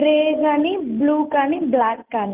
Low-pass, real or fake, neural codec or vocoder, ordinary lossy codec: 3.6 kHz; real; none; Opus, 32 kbps